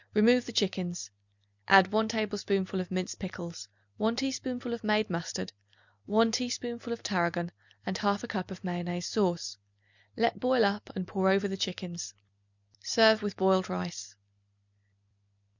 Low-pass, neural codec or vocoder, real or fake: 7.2 kHz; none; real